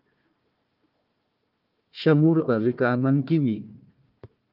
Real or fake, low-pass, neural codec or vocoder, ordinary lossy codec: fake; 5.4 kHz; codec, 16 kHz, 1 kbps, FunCodec, trained on Chinese and English, 50 frames a second; Opus, 32 kbps